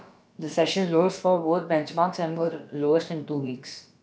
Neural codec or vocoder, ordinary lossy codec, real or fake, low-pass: codec, 16 kHz, about 1 kbps, DyCAST, with the encoder's durations; none; fake; none